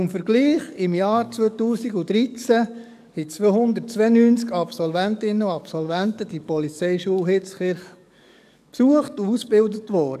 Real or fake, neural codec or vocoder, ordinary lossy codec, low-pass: fake; codec, 44.1 kHz, 7.8 kbps, DAC; none; 14.4 kHz